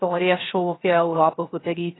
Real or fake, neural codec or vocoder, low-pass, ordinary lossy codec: fake; codec, 16 kHz, 0.3 kbps, FocalCodec; 7.2 kHz; AAC, 16 kbps